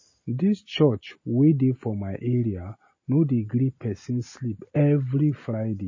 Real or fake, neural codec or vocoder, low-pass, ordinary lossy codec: real; none; 7.2 kHz; MP3, 32 kbps